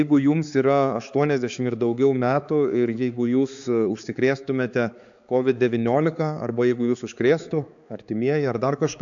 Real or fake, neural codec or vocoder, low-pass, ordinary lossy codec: fake; codec, 16 kHz, 4 kbps, X-Codec, HuBERT features, trained on balanced general audio; 7.2 kHz; AAC, 64 kbps